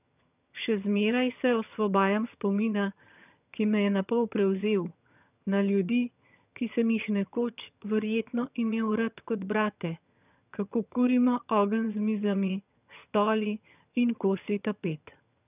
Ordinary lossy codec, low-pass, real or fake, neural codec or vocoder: none; 3.6 kHz; fake; vocoder, 22.05 kHz, 80 mel bands, HiFi-GAN